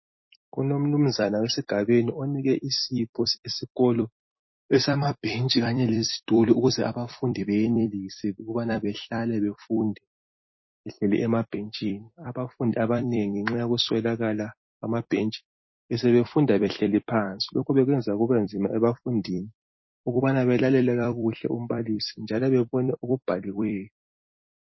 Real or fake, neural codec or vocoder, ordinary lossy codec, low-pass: fake; vocoder, 44.1 kHz, 128 mel bands every 256 samples, BigVGAN v2; MP3, 24 kbps; 7.2 kHz